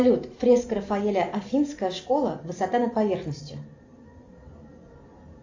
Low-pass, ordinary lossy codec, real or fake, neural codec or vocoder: 7.2 kHz; AAC, 48 kbps; real; none